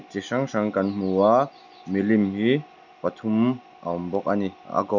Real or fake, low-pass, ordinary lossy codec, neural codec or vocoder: real; 7.2 kHz; none; none